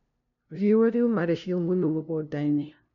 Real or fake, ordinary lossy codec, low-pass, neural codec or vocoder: fake; none; 7.2 kHz; codec, 16 kHz, 0.5 kbps, FunCodec, trained on LibriTTS, 25 frames a second